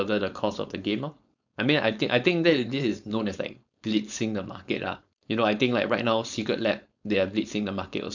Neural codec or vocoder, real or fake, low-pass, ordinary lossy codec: codec, 16 kHz, 4.8 kbps, FACodec; fake; 7.2 kHz; none